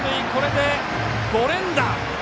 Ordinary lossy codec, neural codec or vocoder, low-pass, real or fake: none; none; none; real